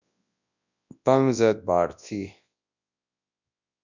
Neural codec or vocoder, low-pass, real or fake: codec, 24 kHz, 0.9 kbps, WavTokenizer, large speech release; 7.2 kHz; fake